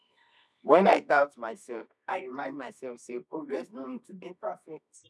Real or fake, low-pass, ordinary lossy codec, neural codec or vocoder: fake; none; none; codec, 24 kHz, 0.9 kbps, WavTokenizer, medium music audio release